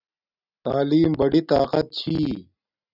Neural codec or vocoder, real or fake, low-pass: none; real; 5.4 kHz